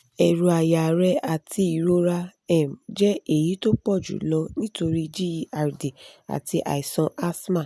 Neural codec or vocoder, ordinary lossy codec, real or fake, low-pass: none; none; real; none